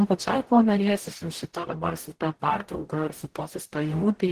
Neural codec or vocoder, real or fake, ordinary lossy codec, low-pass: codec, 44.1 kHz, 0.9 kbps, DAC; fake; Opus, 16 kbps; 14.4 kHz